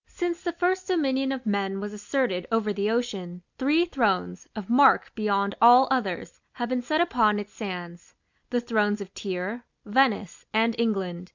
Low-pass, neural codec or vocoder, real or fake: 7.2 kHz; none; real